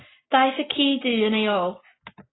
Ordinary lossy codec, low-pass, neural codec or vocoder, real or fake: AAC, 16 kbps; 7.2 kHz; none; real